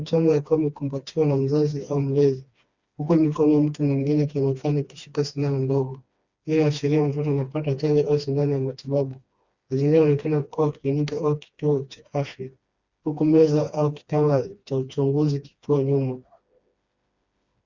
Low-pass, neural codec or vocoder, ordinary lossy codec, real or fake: 7.2 kHz; codec, 16 kHz, 2 kbps, FreqCodec, smaller model; Opus, 64 kbps; fake